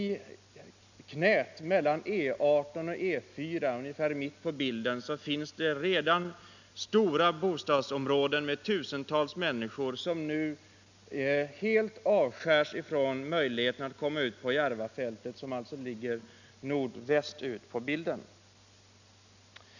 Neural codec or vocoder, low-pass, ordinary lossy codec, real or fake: none; 7.2 kHz; none; real